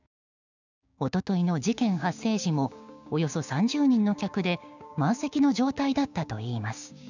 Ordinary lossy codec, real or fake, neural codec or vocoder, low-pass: none; fake; codec, 16 kHz, 6 kbps, DAC; 7.2 kHz